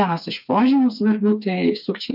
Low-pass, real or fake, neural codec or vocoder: 5.4 kHz; fake; codec, 16 kHz, 4 kbps, FreqCodec, smaller model